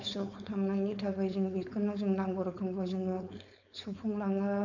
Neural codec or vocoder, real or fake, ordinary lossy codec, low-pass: codec, 16 kHz, 4.8 kbps, FACodec; fake; none; 7.2 kHz